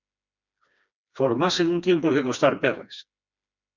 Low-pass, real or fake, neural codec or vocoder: 7.2 kHz; fake; codec, 16 kHz, 2 kbps, FreqCodec, smaller model